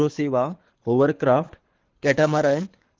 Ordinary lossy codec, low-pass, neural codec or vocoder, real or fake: Opus, 16 kbps; 7.2 kHz; codec, 24 kHz, 6 kbps, HILCodec; fake